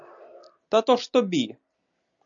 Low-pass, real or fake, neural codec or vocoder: 7.2 kHz; real; none